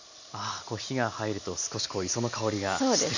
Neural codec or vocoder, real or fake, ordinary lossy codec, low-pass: none; real; none; 7.2 kHz